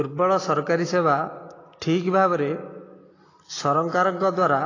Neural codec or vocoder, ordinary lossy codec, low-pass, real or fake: none; AAC, 32 kbps; 7.2 kHz; real